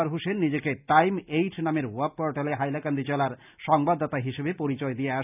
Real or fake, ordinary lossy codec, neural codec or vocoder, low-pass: real; none; none; 3.6 kHz